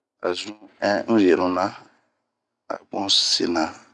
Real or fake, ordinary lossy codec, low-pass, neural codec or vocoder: real; none; 10.8 kHz; none